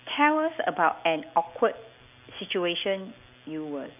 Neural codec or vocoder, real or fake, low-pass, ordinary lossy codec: none; real; 3.6 kHz; none